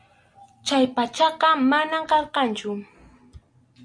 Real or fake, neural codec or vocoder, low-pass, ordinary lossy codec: real; none; 9.9 kHz; MP3, 64 kbps